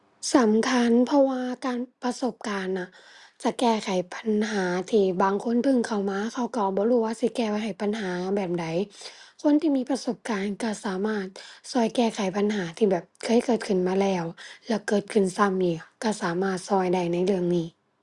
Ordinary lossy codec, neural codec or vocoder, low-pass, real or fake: Opus, 64 kbps; none; 10.8 kHz; real